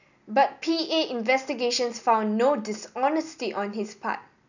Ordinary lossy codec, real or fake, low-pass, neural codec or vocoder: none; real; 7.2 kHz; none